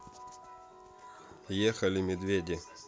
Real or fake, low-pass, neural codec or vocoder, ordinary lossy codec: real; none; none; none